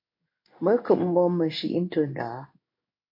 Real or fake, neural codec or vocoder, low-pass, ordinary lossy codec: fake; codec, 16 kHz in and 24 kHz out, 1 kbps, XY-Tokenizer; 5.4 kHz; MP3, 32 kbps